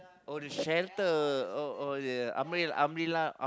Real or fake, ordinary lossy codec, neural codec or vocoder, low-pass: real; none; none; none